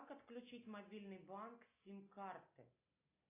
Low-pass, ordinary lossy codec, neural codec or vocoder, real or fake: 3.6 kHz; AAC, 24 kbps; none; real